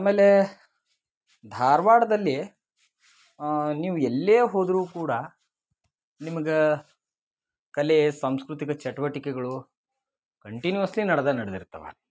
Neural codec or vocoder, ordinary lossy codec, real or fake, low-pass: none; none; real; none